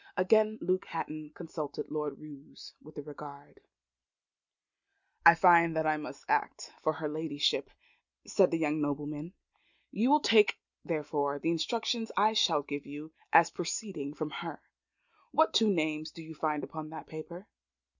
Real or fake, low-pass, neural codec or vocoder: real; 7.2 kHz; none